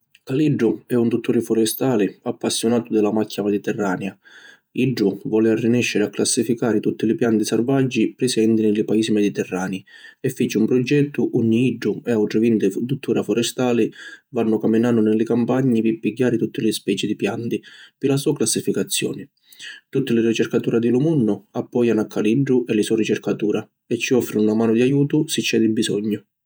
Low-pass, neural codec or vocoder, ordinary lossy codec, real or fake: none; vocoder, 48 kHz, 128 mel bands, Vocos; none; fake